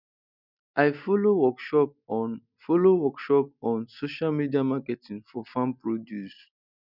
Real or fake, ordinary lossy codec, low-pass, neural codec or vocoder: real; none; 5.4 kHz; none